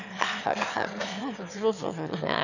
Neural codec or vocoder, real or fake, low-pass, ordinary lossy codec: autoencoder, 22.05 kHz, a latent of 192 numbers a frame, VITS, trained on one speaker; fake; 7.2 kHz; none